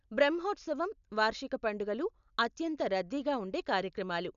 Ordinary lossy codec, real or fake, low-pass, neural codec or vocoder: none; real; 7.2 kHz; none